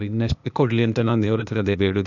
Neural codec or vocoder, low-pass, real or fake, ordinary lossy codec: codec, 16 kHz, 0.8 kbps, ZipCodec; 7.2 kHz; fake; none